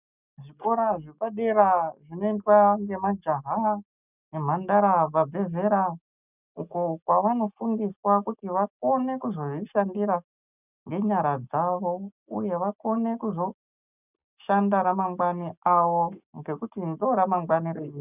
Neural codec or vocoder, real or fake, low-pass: none; real; 3.6 kHz